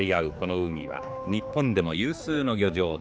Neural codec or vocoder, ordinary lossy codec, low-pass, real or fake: codec, 16 kHz, 4 kbps, X-Codec, HuBERT features, trained on general audio; none; none; fake